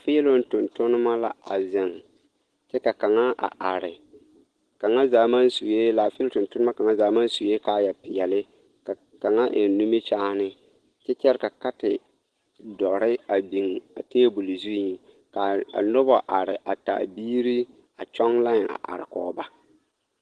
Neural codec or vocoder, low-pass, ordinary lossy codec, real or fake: none; 9.9 kHz; Opus, 16 kbps; real